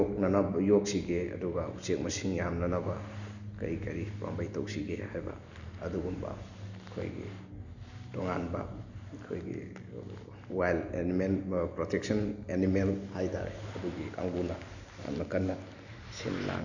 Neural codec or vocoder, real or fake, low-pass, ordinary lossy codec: none; real; 7.2 kHz; none